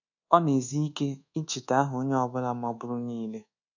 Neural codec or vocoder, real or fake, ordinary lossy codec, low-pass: codec, 24 kHz, 1.2 kbps, DualCodec; fake; none; 7.2 kHz